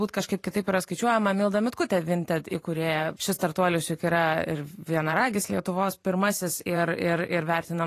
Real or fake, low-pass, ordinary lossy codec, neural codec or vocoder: fake; 14.4 kHz; AAC, 48 kbps; vocoder, 44.1 kHz, 128 mel bands every 512 samples, BigVGAN v2